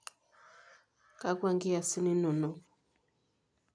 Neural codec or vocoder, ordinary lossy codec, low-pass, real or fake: none; none; 9.9 kHz; real